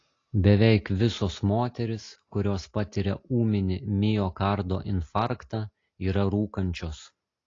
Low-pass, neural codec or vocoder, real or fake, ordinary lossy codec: 7.2 kHz; none; real; AAC, 32 kbps